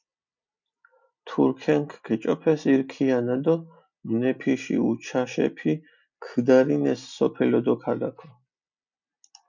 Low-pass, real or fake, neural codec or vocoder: 7.2 kHz; real; none